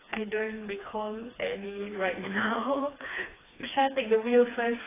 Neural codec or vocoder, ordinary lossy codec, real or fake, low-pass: codec, 16 kHz, 2 kbps, FreqCodec, smaller model; AAC, 16 kbps; fake; 3.6 kHz